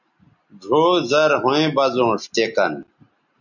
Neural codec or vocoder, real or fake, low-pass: none; real; 7.2 kHz